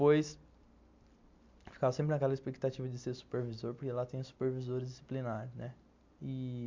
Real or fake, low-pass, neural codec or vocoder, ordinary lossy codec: real; 7.2 kHz; none; MP3, 64 kbps